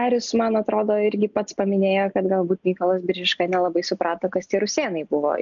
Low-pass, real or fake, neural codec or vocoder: 7.2 kHz; real; none